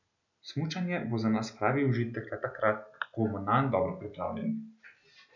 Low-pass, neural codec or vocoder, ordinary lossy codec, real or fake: 7.2 kHz; none; none; real